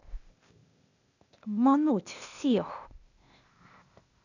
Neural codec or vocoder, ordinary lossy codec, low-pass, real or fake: codec, 16 kHz, 0.8 kbps, ZipCodec; none; 7.2 kHz; fake